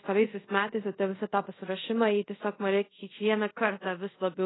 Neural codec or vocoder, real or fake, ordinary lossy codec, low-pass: codec, 24 kHz, 0.5 kbps, DualCodec; fake; AAC, 16 kbps; 7.2 kHz